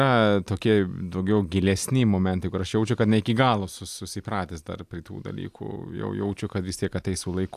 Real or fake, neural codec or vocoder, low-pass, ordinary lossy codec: real; none; 14.4 kHz; AAC, 96 kbps